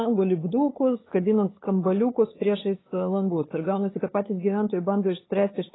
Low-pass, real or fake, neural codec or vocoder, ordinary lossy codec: 7.2 kHz; fake; codec, 16 kHz, 8 kbps, FunCodec, trained on LibriTTS, 25 frames a second; AAC, 16 kbps